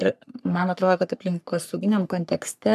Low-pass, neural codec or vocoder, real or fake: 14.4 kHz; codec, 44.1 kHz, 3.4 kbps, Pupu-Codec; fake